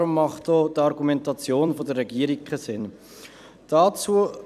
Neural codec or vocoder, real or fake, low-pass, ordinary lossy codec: vocoder, 44.1 kHz, 128 mel bands every 256 samples, BigVGAN v2; fake; 14.4 kHz; none